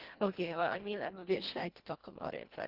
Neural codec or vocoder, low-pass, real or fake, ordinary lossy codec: codec, 24 kHz, 1.5 kbps, HILCodec; 5.4 kHz; fake; Opus, 16 kbps